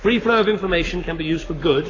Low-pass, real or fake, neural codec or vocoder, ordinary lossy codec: 7.2 kHz; fake; codec, 44.1 kHz, 7.8 kbps, Pupu-Codec; AAC, 32 kbps